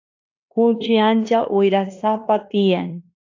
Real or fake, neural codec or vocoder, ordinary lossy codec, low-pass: fake; codec, 16 kHz in and 24 kHz out, 0.9 kbps, LongCat-Audio-Codec, fine tuned four codebook decoder; AAC, 48 kbps; 7.2 kHz